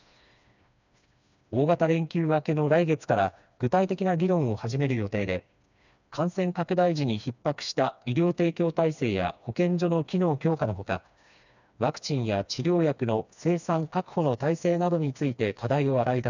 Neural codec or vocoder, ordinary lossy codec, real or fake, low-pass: codec, 16 kHz, 2 kbps, FreqCodec, smaller model; none; fake; 7.2 kHz